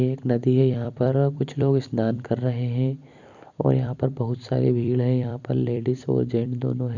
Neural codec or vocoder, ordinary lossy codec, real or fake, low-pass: vocoder, 44.1 kHz, 128 mel bands every 256 samples, BigVGAN v2; none; fake; 7.2 kHz